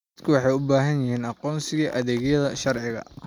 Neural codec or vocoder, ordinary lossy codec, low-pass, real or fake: none; none; 19.8 kHz; real